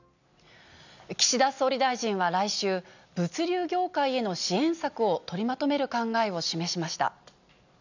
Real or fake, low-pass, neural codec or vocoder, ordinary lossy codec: real; 7.2 kHz; none; none